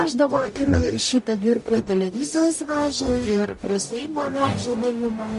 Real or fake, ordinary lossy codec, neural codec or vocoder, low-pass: fake; MP3, 48 kbps; codec, 44.1 kHz, 0.9 kbps, DAC; 14.4 kHz